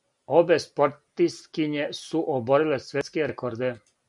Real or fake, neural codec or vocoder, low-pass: real; none; 10.8 kHz